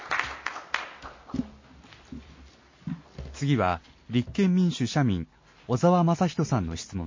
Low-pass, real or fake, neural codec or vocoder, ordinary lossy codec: 7.2 kHz; real; none; MP3, 32 kbps